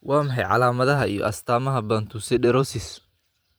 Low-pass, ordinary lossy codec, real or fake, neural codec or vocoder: none; none; fake; vocoder, 44.1 kHz, 128 mel bands every 512 samples, BigVGAN v2